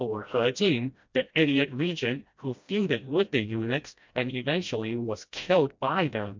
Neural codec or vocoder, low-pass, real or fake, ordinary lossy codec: codec, 16 kHz, 1 kbps, FreqCodec, smaller model; 7.2 kHz; fake; MP3, 64 kbps